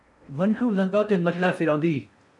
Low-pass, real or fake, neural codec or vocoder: 10.8 kHz; fake; codec, 16 kHz in and 24 kHz out, 0.6 kbps, FocalCodec, streaming, 4096 codes